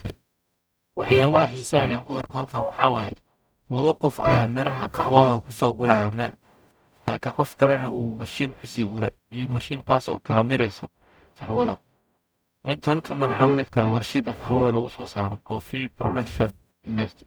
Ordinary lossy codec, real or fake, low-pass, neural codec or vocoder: none; fake; none; codec, 44.1 kHz, 0.9 kbps, DAC